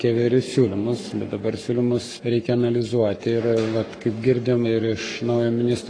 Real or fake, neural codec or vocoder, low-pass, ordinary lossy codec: fake; codec, 44.1 kHz, 7.8 kbps, Pupu-Codec; 9.9 kHz; AAC, 32 kbps